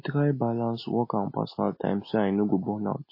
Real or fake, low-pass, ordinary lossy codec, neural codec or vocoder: real; 5.4 kHz; MP3, 24 kbps; none